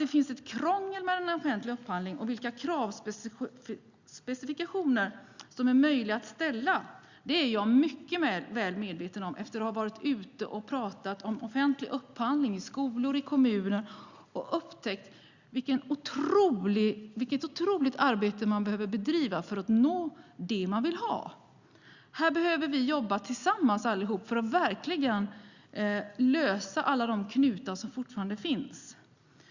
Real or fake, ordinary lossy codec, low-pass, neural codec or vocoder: real; Opus, 64 kbps; 7.2 kHz; none